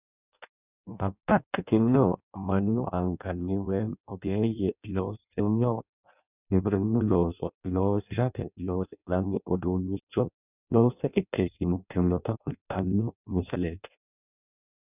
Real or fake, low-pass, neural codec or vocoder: fake; 3.6 kHz; codec, 16 kHz in and 24 kHz out, 0.6 kbps, FireRedTTS-2 codec